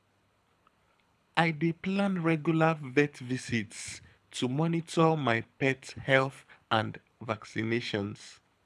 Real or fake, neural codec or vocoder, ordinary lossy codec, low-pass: fake; codec, 24 kHz, 6 kbps, HILCodec; none; none